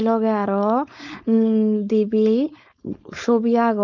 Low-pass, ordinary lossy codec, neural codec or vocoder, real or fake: 7.2 kHz; none; codec, 16 kHz, 4.8 kbps, FACodec; fake